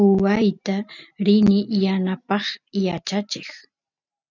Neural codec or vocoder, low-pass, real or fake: none; 7.2 kHz; real